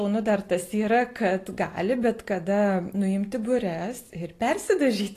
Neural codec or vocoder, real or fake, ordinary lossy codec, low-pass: none; real; AAC, 48 kbps; 14.4 kHz